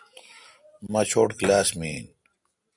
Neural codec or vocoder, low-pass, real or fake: none; 10.8 kHz; real